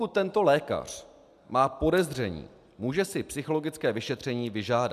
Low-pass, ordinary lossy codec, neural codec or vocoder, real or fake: 14.4 kHz; MP3, 96 kbps; none; real